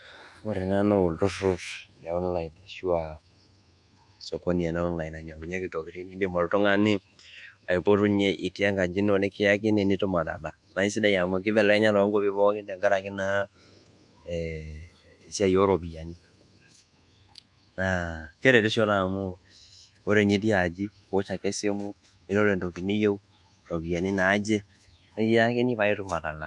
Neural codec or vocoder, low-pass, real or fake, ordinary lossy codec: codec, 24 kHz, 1.2 kbps, DualCodec; 10.8 kHz; fake; none